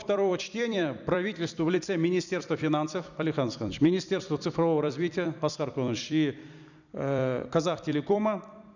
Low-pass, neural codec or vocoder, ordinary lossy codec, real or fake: 7.2 kHz; vocoder, 44.1 kHz, 128 mel bands every 256 samples, BigVGAN v2; none; fake